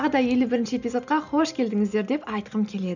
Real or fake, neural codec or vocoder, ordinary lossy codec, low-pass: real; none; none; 7.2 kHz